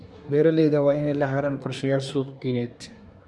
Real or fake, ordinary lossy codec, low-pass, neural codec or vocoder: fake; none; none; codec, 24 kHz, 1 kbps, SNAC